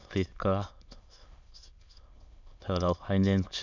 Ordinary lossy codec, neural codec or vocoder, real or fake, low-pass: none; autoencoder, 22.05 kHz, a latent of 192 numbers a frame, VITS, trained on many speakers; fake; 7.2 kHz